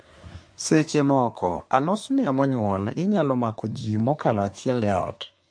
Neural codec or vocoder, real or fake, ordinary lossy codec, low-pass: codec, 24 kHz, 1 kbps, SNAC; fake; MP3, 48 kbps; 9.9 kHz